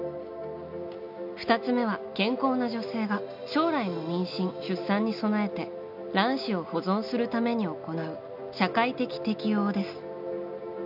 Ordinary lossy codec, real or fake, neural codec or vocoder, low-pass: none; real; none; 5.4 kHz